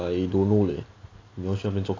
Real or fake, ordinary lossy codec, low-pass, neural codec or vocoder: real; AAC, 32 kbps; 7.2 kHz; none